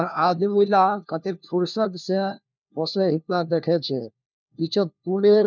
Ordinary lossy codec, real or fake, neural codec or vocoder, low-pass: none; fake; codec, 16 kHz, 1 kbps, FunCodec, trained on LibriTTS, 50 frames a second; 7.2 kHz